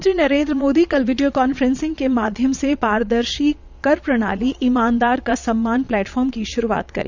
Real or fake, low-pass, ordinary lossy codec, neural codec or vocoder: fake; 7.2 kHz; none; vocoder, 22.05 kHz, 80 mel bands, Vocos